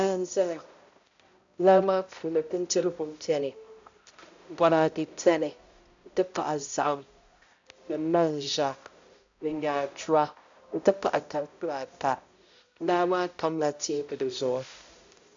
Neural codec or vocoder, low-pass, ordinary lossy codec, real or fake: codec, 16 kHz, 0.5 kbps, X-Codec, HuBERT features, trained on balanced general audio; 7.2 kHz; AAC, 64 kbps; fake